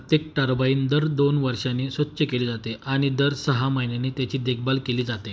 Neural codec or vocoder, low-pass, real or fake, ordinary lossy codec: none; none; real; none